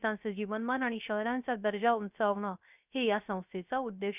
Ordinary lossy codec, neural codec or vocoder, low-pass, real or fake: none; codec, 16 kHz, 0.3 kbps, FocalCodec; 3.6 kHz; fake